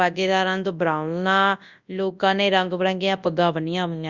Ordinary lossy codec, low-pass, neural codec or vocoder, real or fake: Opus, 64 kbps; 7.2 kHz; codec, 24 kHz, 0.9 kbps, WavTokenizer, large speech release; fake